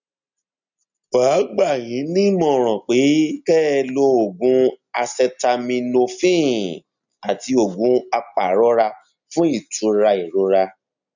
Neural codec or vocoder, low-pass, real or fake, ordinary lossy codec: none; 7.2 kHz; real; none